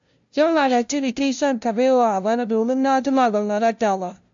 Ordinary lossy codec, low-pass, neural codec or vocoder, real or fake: AAC, 64 kbps; 7.2 kHz; codec, 16 kHz, 0.5 kbps, FunCodec, trained on LibriTTS, 25 frames a second; fake